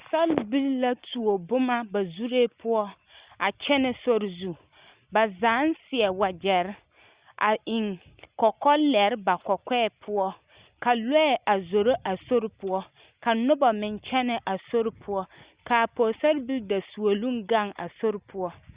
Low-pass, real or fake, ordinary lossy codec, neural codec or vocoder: 3.6 kHz; real; Opus, 64 kbps; none